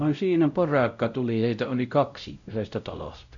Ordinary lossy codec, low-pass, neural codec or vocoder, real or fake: none; 7.2 kHz; codec, 16 kHz, 0.5 kbps, X-Codec, WavLM features, trained on Multilingual LibriSpeech; fake